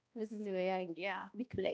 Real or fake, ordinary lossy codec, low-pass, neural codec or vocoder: fake; none; none; codec, 16 kHz, 1 kbps, X-Codec, HuBERT features, trained on balanced general audio